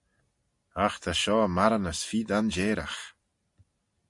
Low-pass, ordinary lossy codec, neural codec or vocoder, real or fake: 10.8 kHz; MP3, 48 kbps; none; real